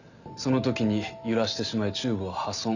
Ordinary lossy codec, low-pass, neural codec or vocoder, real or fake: none; 7.2 kHz; none; real